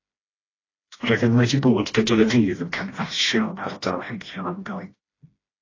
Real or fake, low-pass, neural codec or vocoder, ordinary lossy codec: fake; 7.2 kHz; codec, 16 kHz, 1 kbps, FreqCodec, smaller model; AAC, 32 kbps